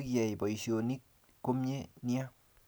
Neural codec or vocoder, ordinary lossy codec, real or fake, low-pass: none; none; real; none